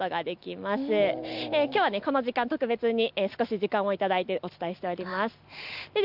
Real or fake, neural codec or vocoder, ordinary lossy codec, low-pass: real; none; none; 5.4 kHz